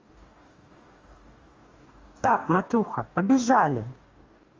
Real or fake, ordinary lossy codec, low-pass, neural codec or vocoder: fake; Opus, 32 kbps; 7.2 kHz; codec, 44.1 kHz, 2.6 kbps, DAC